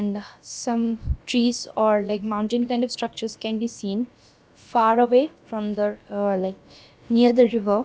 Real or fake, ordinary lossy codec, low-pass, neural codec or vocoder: fake; none; none; codec, 16 kHz, about 1 kbps, DyCAST, with the encoder's durations